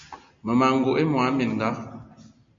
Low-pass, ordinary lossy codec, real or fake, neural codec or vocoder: 7.2 kHz; MP3, 48 kbps; real; none